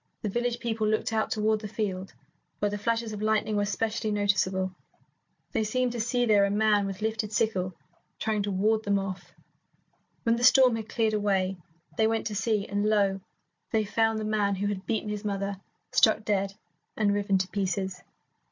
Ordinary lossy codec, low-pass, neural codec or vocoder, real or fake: MP3, 48 kbps; 7.2 kHz; none; real